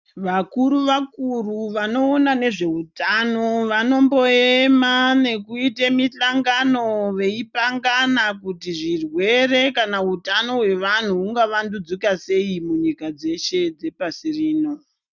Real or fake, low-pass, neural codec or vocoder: real; 7.2 kHz; none